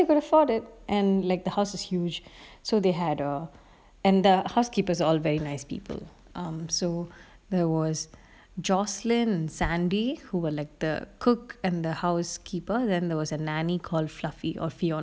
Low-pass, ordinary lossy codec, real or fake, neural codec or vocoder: none; none; real; none